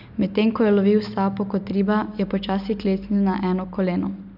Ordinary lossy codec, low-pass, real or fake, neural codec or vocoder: none; 5.4 kHz; real; none